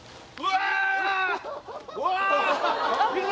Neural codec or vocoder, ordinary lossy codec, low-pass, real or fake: none; none; none; real